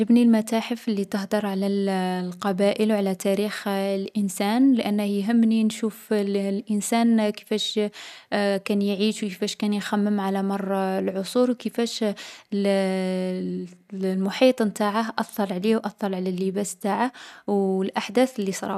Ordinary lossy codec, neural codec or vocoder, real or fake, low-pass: none; none; real; 14.4 kHz